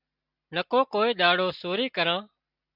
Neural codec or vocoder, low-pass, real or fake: none; 5.4 kHz; real